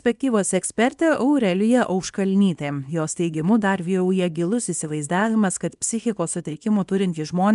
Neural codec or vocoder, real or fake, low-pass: codec, 24 kHz, 0.9 kbps, WavTokenizer, small release; fake; 10.8 kHz